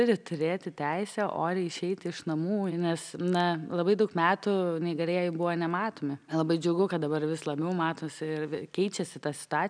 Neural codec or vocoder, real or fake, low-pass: none; real; 9.9 kHz